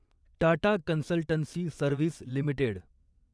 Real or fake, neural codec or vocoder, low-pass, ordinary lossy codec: fake; vocoder, 22.05 kHz, 80 mel bands, WaveNeXt; none; none